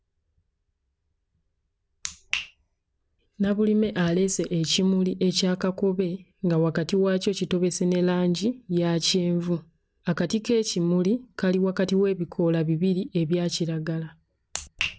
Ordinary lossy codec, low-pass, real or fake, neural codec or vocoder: none; none; real; none